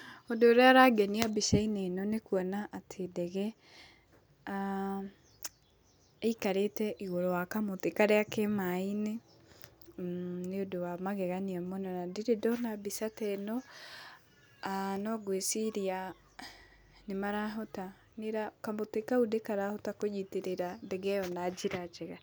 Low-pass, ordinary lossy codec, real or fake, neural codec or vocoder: none; none; real; none